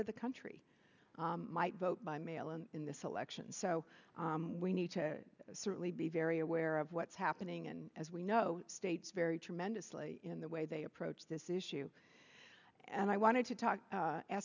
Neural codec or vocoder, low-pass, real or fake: none; 7.2 kHz; real